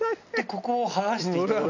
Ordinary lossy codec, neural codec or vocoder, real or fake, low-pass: none; none; real; 7.2 kHz